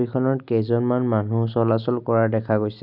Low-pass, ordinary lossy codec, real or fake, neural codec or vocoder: 5.4 kHz; Opus, 64 kbps; real; none